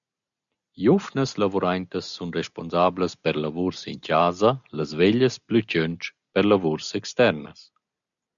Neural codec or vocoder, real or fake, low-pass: none; real; 7.2 kHz